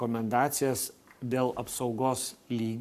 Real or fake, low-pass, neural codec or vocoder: fake; 14.4 kHz; codec, 44.1 kHz, 7.8 kbps, Pupu-Codec